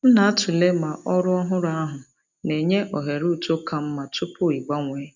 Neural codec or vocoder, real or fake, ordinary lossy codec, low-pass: none; real; none; 7.2 kHz